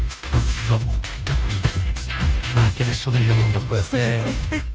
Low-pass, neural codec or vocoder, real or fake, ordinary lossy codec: none; codec, 16 kHz, 0.5 kbps, FunCodec, trained on Chinese and English, 25 frames a second; fake; none